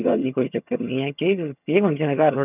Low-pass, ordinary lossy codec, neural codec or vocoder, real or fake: 3.6 kHz; none; vocoder, 22.05 kHz, 80 mel bands, HiFi-GAN; fake